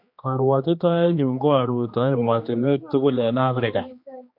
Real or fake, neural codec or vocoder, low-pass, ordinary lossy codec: fake; codec, 16 kHz, 2 kbps, X-Codec, HuBERT features, trained on general audio; 5.4 kHz; MP3, 48 kbps